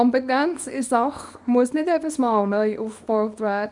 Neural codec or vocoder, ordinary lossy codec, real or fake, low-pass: codec, 24 kHz, 0.9 kbps, WavTokenizer, small release; none; fake; 10.8 kHz